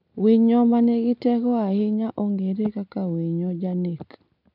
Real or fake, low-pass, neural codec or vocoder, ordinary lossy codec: real; 5.4 kHz; none; none